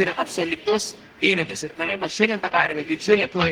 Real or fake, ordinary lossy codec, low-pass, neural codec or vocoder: fake; Opus, 24 kbps; 19.8 kHz; codec, 44.1 kHz, 0.9 kbps, DAC